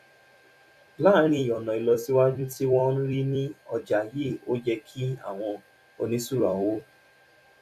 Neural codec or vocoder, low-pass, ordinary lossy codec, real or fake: vocoder, 44.1 kHz, 128 mel bands every 256 samples, BigVGAN v2; 14.4 kHz; none; fake